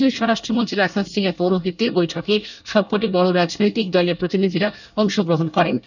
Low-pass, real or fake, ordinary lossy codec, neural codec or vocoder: 7.2 kHz; fake; none; codec, 24 kHz, 1 kbps, SNAC